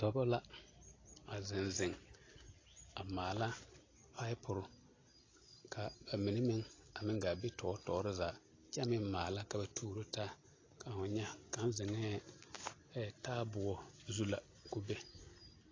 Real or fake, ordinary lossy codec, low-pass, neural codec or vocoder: real; AAC, 32 kbps; 7.2 kHz; none